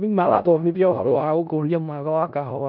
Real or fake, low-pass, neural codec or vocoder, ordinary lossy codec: fake; 5.4 kHz; codec, 16 kHz in and 24 kHz out, 0.4 kbps, LongCat-Audio-Codec, four codebook decoder; none